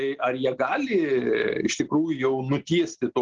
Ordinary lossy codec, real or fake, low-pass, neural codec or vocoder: Opus, 16 kbps; real; 7.2 kHz; none